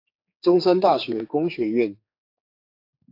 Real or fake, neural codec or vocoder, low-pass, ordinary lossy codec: fake; codec, 16 kHz, 4 kbps, X-Codec, HuBERT features, trained on general audio; 5.4 kHz; AAC, 32 kbps